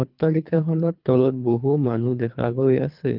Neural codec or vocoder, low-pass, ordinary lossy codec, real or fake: codec, 24 kHz, 3 kbps, HILCodec; 5.4 kHz; Opus, 24 kbps; fake